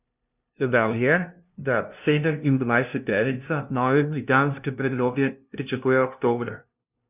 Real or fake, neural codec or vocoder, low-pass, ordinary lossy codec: fake; codec, 16 kHz, 0.5 kbps, FunCodec, trained on LibriTTS, 25 frames a second; 3.6 kHz; none